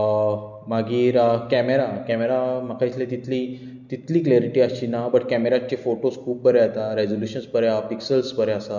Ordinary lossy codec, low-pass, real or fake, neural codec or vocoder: none; 7.2 kHz; real; none